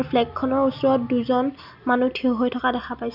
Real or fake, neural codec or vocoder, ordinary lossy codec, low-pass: real; none; none; 5.4 kHz